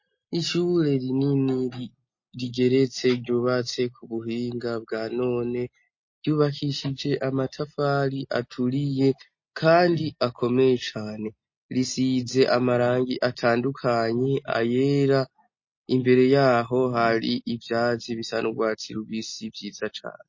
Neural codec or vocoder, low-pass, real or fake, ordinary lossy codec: none; 7.2 kHz; real; MP3, 32 kbps